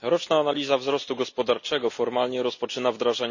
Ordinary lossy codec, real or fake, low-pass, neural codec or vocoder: none; real; 7.2 kHz; none